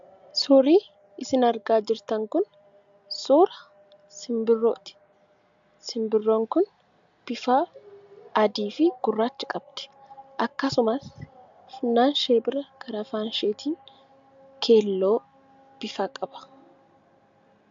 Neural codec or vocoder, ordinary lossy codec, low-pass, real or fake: none; MP3, 64 kbps; 7.2 kHz; real